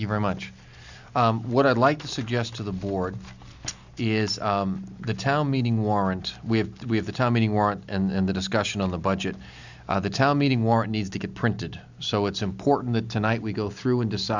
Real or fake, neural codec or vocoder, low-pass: real; none; 7.2 kHz